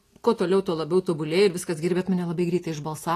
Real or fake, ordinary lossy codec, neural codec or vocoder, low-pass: real; AAC, 48 kbps; none; 14.4 kHz